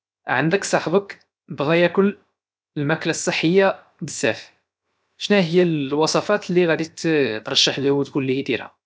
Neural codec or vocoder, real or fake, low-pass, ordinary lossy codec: codec, 16 kHz, 0.7 kbps, FocalCodec; fake; none; none